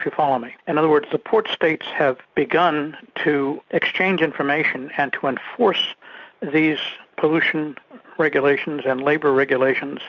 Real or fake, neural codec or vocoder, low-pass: real; none; 7.2 kHz